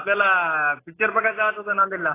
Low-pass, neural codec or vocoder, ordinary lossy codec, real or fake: 3.6 kHz; none; AAC, 16 kbps; real